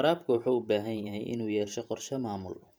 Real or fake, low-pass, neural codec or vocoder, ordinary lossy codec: fake; none; vocoder, 44.1 kHz, 128 mel bands every 512 samples, BigVGAN v2; none